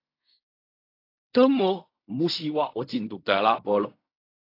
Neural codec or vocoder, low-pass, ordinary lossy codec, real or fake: codec, 16 kHz in and 24 kHz out, 0.4 kbps, LongCat-Audio-Codec, fine tuned four codebook decoder; 5.4 kHz; AAC, 32 kbps; fake